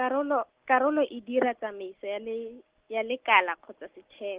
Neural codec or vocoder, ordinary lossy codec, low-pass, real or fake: none; Opus, 16 kbps; 3.6 kHz; real